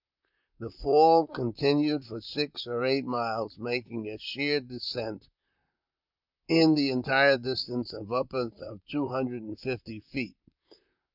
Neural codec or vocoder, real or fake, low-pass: vocoder, 44.1 kHz, 128 mel bands, Pupu-Vocoder; fake; 5.4 kHz